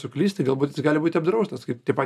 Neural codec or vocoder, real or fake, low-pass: none; real; 14.4 kHz